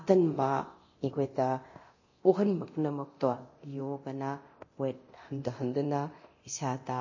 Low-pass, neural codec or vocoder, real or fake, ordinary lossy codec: 7.2 kHz; codec, 24 kHz, 0.9 kbps, DualCodec; fake; MP3, 32 kbps